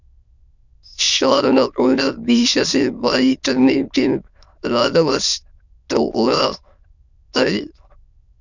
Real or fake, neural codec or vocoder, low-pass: fake; autoencoder, 22.05 kHz, a latent of 192 numbers a frame, VITS, trained on many speakers; 7.2 kHz